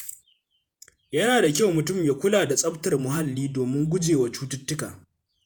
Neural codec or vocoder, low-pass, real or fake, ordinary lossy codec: vocoder, 48 kHz, 128 mel bands, Vocos; none; fake; none